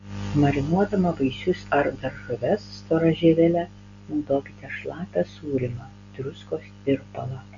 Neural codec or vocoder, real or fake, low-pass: none; real; 7.2 kHz